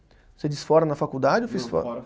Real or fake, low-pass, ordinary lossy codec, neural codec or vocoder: real; none; none; none